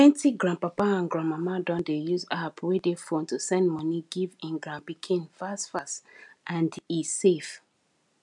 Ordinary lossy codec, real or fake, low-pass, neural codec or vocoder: none; real; 10.8 kHz; none